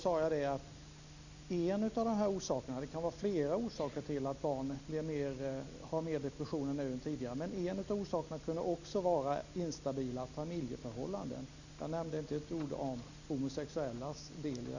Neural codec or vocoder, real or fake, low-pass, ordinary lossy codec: none; real; 7.2 kHz; none